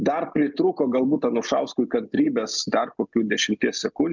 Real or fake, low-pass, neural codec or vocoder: real; 7.2 kHz; none